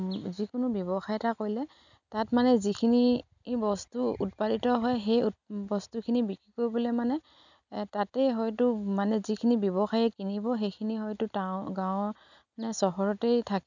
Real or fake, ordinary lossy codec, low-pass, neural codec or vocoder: real; none; 7.2 kHz; none